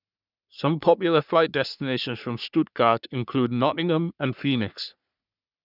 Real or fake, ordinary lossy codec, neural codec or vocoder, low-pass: fake; none; codec, 44.1 kHz, 3.4 kbps, Pupu-Codec; 5.4 kHz